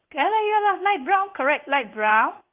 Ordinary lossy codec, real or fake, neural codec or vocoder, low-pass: Opus, 24 kbps; fake; codec, 16 kHz in and 24 kHz out, 1 kbps, XY-Tokenizer; 3.6 kHz